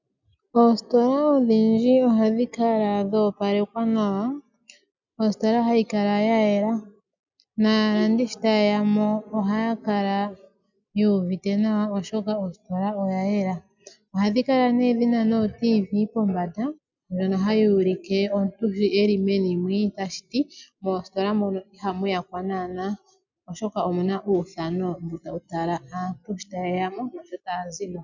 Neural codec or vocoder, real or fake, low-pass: none; real; 7.2 kHz